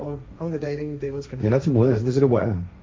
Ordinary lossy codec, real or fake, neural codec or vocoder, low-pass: none; fake; codec, 16 kHz, 1.1 kbps, Voila-Tokenizer; none